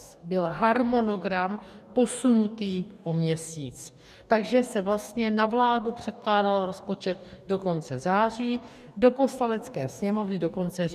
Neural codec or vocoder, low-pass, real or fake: codec, 44.1 kHz, 2.6 kbps, DAC; 14.4 kHz; fake